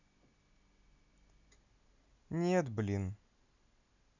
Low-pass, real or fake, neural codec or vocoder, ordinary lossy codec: 7.2 kHz; real; none; none